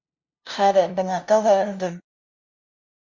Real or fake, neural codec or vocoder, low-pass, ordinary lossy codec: fake; codec, 16 kHz, 0.5 kbps, FunCodec, trained on LibriTTS, 25 frames a second; 7.2 kHz; MP3, 48 kbps